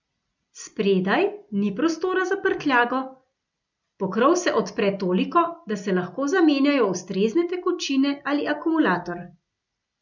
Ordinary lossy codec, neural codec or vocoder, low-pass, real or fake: none; none; 7.2 kHz; real